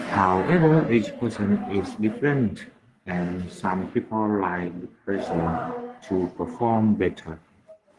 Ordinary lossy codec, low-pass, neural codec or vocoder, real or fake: Opus, 24 kbps; 10.8 kHz; codec, 44.1 kHz, 3.4 kbps, Pupu-Codec; fake